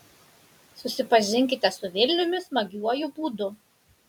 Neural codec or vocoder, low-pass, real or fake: vocoder, 44.1 kHz, 128 mel bands every 256 samples, BigVGAN v2; 19.8 kHz; fake